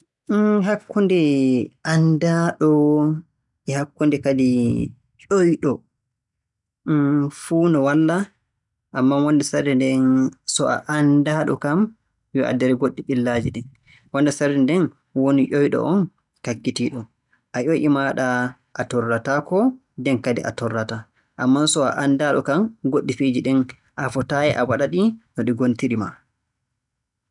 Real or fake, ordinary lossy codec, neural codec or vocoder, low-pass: real; none; none; 10.8 kHz